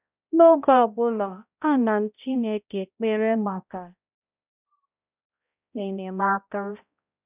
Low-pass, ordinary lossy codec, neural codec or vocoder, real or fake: 3.6 kHz; none; codec, 16 kHz, 0.5 kbps, X-Codec, HuBERT features, trained on balanced general audio; fake